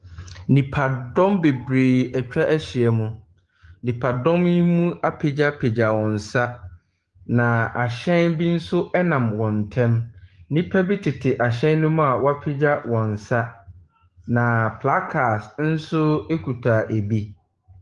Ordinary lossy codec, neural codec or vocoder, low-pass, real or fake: Opus, 24 kbps; codec, 44.1 kHz, 7.8 kbps, DAC; 10.8 kHz; fake